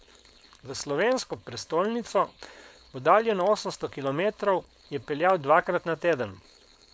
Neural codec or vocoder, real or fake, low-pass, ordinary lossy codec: codec, 16 kHz, 4.8 kbps, FACodec; fake; none; none